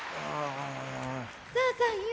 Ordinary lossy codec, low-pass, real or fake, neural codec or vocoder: none; none; real; none